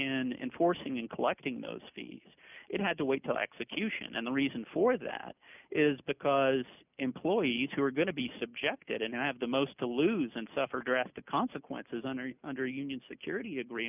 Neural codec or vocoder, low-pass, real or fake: none; 3.6 kHz; real